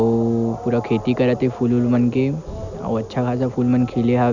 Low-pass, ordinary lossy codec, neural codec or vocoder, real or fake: 7.2 kHz; none; none; real